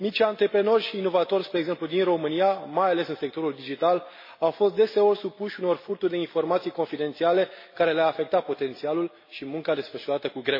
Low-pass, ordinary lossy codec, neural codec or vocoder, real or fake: 5.4 kHz; MP3, 24 kbps; none; real